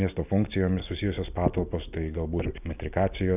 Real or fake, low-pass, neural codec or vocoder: real; 3.6 kHz; none